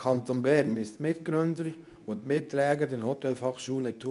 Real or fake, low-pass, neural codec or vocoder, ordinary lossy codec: fake; 10.8 kHz; codec, 24 kHz, 0.9 kbps, WavTokenizer, medium speech release version 2; none